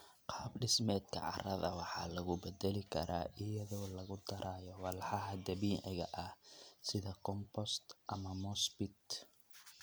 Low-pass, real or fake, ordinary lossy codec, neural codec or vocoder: none; real; none; none